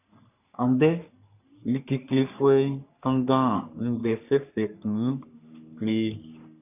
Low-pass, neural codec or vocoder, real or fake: 3.6 kHz; codec, 44.1 kHz, 3.4 kbps, Pupu-Codec; fake